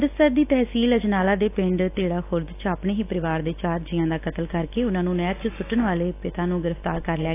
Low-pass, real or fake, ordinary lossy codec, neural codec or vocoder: 3.6 kHz; real; AAC, 24 kbps; none